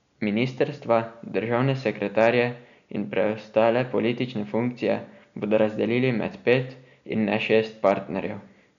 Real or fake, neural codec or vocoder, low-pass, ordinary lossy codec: real; none; 7.2 kHz; none